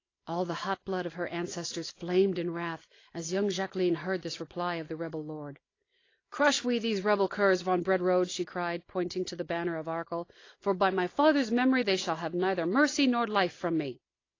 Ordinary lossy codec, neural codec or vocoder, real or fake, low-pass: AAC, 32 kbps; none; real; 7.2 kHz